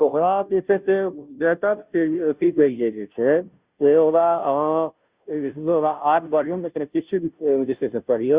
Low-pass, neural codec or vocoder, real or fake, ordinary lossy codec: 3.6 kHz; codec, 16 kHz, 0.5 kbps, FunCodec, trained on Chinese and English, 25 frames a second; fake; Opus, 64 kbps